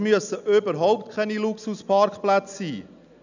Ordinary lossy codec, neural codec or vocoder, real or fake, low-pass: none; none; real; 7.2 kHz